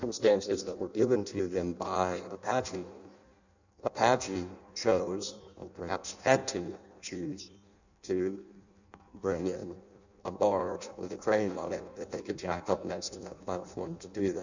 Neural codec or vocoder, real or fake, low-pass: codec, 16 kHz in and 24 kHz out, 0.6 kbps, FireRedTTS-2 codec; fake; 7.2 kHz